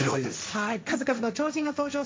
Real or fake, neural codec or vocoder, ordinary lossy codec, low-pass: fake; codec, 16 kHz, 1.1 kbps, Voila-Tokenizer; none; none